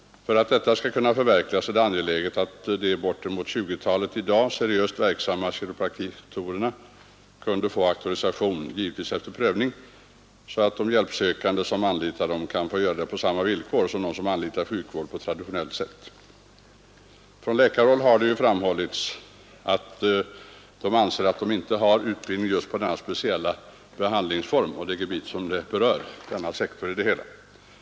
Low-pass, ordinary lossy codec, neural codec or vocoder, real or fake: none; none; none; real